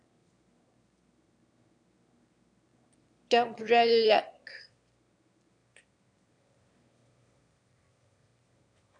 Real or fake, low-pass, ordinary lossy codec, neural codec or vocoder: fake; 9.9 kHz; MP3, 64 kbps; autoencoder, 22.05 kHz, a latent of 192 numbers a frame, VITS, trained on one speaker